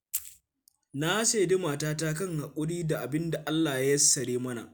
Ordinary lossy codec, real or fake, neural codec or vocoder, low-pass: none; real; none; none